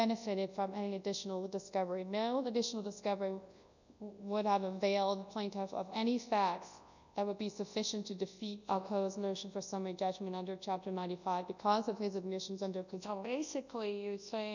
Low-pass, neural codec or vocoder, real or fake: 7.2 kHz; codec, 24 kHz, 0.9 kbps, WavTokenizer, large speech release; fake